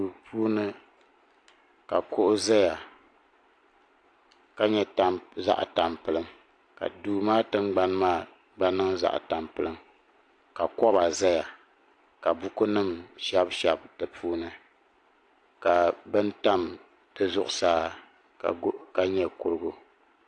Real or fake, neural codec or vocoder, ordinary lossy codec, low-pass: real; none; AAC, 48 kbps; 9.9 kHz